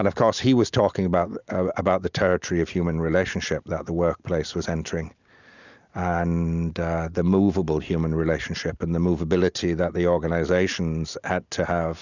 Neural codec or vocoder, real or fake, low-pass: none; real; 7.2 kHz